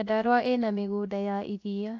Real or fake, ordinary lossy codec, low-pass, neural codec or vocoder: fake; AAC, 48 kbps; 7.2 kHz; codec, 16 kHz, 0.7 kbps, FocalCodec